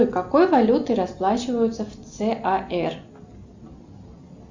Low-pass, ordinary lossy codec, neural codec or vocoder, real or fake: 7.2 kHz; Opus, 64 kbps; none; real